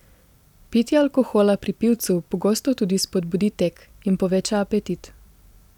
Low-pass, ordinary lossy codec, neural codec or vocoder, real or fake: 19.8 kHz; none; vocoder, 44.1 kHz, 128 mel bands every 256 samples, BigVGAN v2; fake